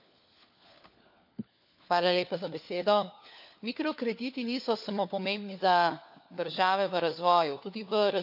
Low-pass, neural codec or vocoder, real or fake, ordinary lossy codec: 5.4 kHz; codec, 16 kHz, 4 kbps, FunCodec, trained on LibriTTS, 50 frames a second; fake; AAC, 48 kbps